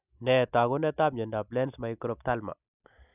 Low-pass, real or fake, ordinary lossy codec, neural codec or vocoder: 3.6 kHz; real; none; none